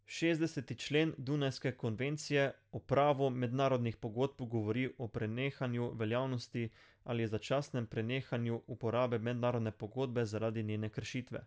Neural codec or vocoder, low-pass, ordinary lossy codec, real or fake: none; none; none; real